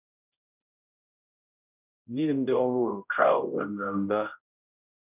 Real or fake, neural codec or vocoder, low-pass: fake; codec, 16 kHz, 0.5 kbps, X-Codec, HuBERT features, trained on general audio; 3.6 kHz